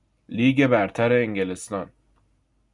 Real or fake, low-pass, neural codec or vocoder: real; 10.8 kHz; none